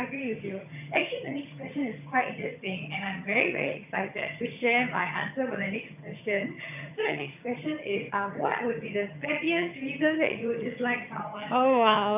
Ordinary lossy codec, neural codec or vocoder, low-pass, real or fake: none; vocoder, 22.05 kHz, 80 mel bands, HiFi-GAN; 3.6 kHz; fake